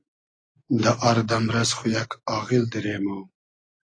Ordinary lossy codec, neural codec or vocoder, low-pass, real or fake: AAC, 32 kbps; none; 9.9 kHz; real